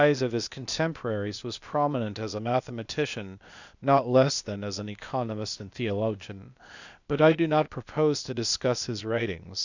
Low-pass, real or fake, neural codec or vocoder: 7.2 kHz; fake; codec, 16 kHz, 0.8 kbps, ZipCodec